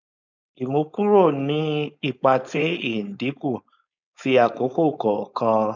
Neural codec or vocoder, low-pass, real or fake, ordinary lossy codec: codec, 16 kHz, 4.8 kbps, FACodec; 7.2 kHz; fake; none